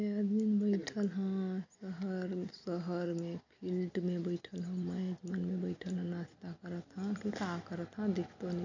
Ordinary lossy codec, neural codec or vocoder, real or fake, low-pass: AAC, 48 kbps; none; real; 7.2 kHz